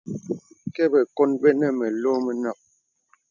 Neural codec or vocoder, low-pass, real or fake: none; 7.2 kHz; real